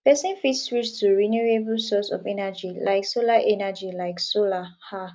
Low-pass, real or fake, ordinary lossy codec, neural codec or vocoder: none; real; none; none